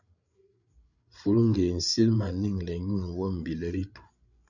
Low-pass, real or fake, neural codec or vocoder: 7.2 kHz; fake; codec, 16 kHz, 8 kbps, FreqCodec, larger model